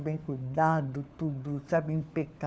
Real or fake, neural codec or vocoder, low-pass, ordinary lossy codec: fake; codec, 16 kHz, 4 kbps, FunCodec, trained on LibriTTS, 50 frames a second; none; none